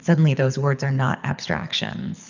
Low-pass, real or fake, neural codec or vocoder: 7.2 kHz; fake; codec, 24 kHz, 6 kbps, HILCodec